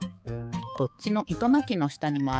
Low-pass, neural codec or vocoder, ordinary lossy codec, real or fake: none; codec, 16 kHz, 4 kbps, X-Codec, HuBERT features, trained on balanced general audio; none; fake